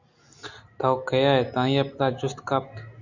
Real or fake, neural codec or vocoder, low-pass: real; none; 7.2 kHz